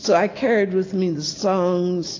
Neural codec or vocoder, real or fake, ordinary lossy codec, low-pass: none; real; AAC, 32 kbps; 7.2 kHz